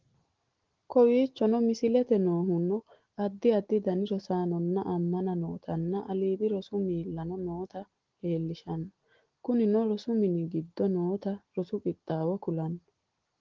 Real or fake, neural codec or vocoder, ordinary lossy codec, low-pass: real; none; Opus, 16 kbps; 7.2 kHz